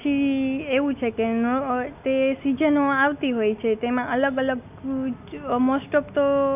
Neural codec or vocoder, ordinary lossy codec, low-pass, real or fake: none; none; 3.6 kHz; real